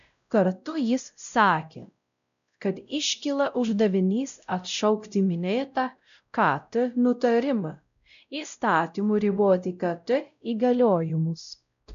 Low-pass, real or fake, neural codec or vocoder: 7.2 kHz; fake; codec, 16 kHz, 0.5 kbps, X-Codec, WavLM features, trained on Multilingual LibriSpeech